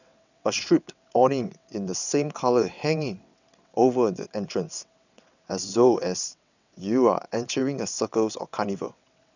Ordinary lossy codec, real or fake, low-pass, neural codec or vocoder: none; fake; 7.2 kHz; vocoder, 22.05 kHz, 80 mel bands, WaveNeXt